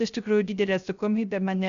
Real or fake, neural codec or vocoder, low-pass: fake; codec, 16 kHz, 0.3 kbps, FocalCodec; 7.2 kHz